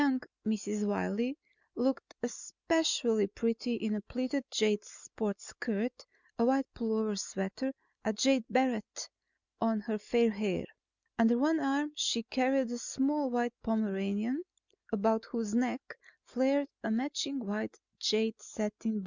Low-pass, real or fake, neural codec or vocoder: 7.2 kHz; real; none